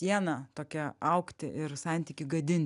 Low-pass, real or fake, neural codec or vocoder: 10.8 kHz; real; none